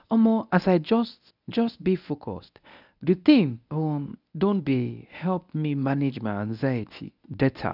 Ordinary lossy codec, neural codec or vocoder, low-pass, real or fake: none; codec, 24 kHz, 0.9 kbps, WavTokenizer, medium speech release version 1; 5.4 kHz; fake